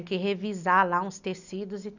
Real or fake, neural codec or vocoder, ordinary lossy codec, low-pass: real; none; none; 7.2 kHz